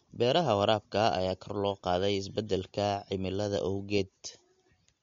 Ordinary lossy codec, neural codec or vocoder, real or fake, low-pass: MP3, 48 kbps; none; real; 7.2 kHz